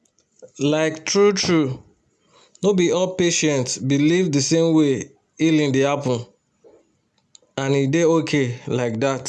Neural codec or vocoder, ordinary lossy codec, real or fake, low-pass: none; none; real; none